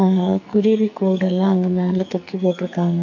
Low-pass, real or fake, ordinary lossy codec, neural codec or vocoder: 7.2 kHz; fake; none; codec, 44.1 kHz, 2.6 kbps, SNAC